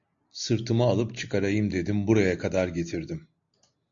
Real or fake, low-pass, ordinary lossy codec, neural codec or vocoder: real; 7.2 kHz; AAC, 64 kbps; none